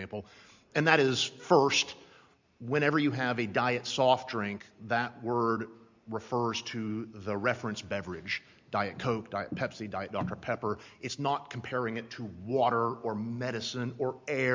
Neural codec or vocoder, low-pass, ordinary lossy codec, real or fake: none; 7.2 kHz; MP3, 64 kbps; real